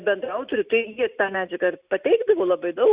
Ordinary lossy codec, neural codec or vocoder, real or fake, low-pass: Opus, 64 kbps; none; real; 3.6 kHz